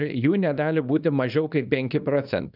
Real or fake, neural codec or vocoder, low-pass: fake; codec, 24 kHz, 0.9 kbps, WavTokenizer, small release; 5.4 kHz